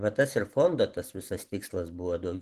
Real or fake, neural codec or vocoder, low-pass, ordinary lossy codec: fake; vocoder, 44.1 kHz, 128 mel bands every 512 samples, BigVGAN v2; 14.4 kHz; Opus, 16 kbps